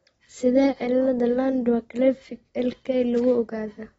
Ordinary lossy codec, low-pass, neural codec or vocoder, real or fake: AAC, 24 kbps; 19.8 kHz; none; real